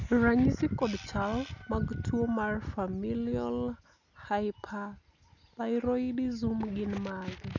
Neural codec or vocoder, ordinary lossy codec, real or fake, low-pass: none; none; real; 7.2 kHz